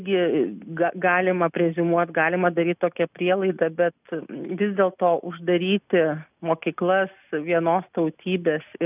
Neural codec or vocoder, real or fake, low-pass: none; real; 3.6 kHz